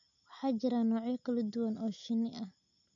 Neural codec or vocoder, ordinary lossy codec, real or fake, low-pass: none; none; real; 7.2 kHz